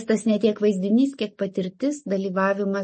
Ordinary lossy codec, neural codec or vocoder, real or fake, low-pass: MP3, 32 kbps; none; real; 10.8 kHz